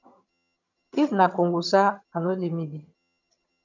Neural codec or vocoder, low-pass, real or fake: vocoder, 22.05 kHz, 80 mel bands, HiFi-GAN; 7.2 kHz; fake